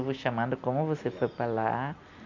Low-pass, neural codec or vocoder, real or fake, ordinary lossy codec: 7.2 kHz; none; real; none